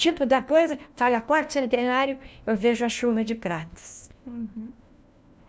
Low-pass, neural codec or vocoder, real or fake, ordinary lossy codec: none; codec, 16 kHz, 1 kbps, FunCodec, trained on LibriTTS, 50 frames a second; fake; none